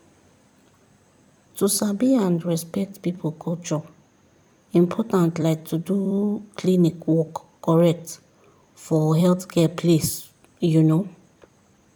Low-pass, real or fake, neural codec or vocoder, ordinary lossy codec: 19.8 kHz; fake; vocoder, 44.1 kHz, 128 mel bands every 256 samples, BigVGAN v2; none